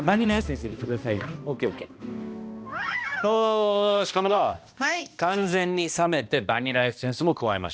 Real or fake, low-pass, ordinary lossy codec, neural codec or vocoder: fake; none; none; codec, 16 kHz, 1 kbps, X-Codec, HuBERT features, trained on balanced general audio